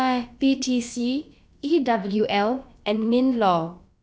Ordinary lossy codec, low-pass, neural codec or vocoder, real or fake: none; none; codec, 16 kHz, about 1 kbps, DyCAST, with the encoder's durations; fake